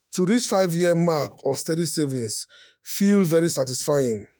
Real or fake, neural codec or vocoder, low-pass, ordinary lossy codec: fake; autoencoder, 48 kHz, 32 numbers a frame, DAC-VAE, trained on Japanese speech; none; none